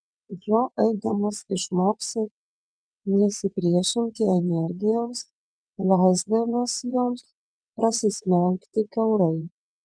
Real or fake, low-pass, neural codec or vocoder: fake; 9.9 kHz; vocoder, 22.05 kHz, 80 mel bands, WaveNeXt